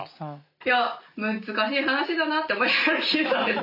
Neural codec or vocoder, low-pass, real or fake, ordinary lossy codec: none; 5.4 kHz; real; none